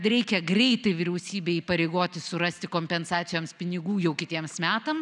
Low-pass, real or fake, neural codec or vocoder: 10.8 kHz; real; none